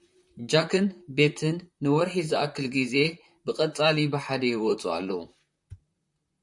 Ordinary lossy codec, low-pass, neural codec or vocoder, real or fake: MP3, 64 kbps; 10.8 kHz; vocoder, 44.1 kHz, 128 mel bands, Pupu-Vocoder; fake